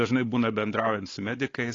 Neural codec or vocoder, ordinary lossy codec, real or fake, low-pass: codec, 16 kHz, 4 kbps, X-Codec, WavLM features, trained on Multilingual LibriSpeech; AAC, 32 kbps; fake; 7.2 kHz